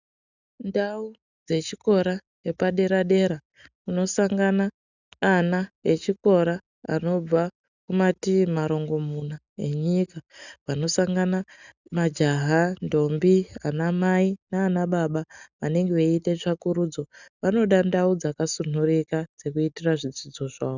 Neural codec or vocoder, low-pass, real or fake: none; 7.2 kHz; real